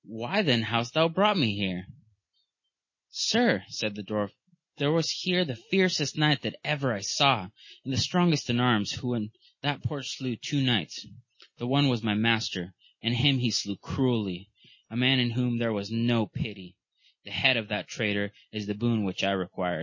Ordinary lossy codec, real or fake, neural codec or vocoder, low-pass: MP3, 32 kbps; real; none; 7.2 kHz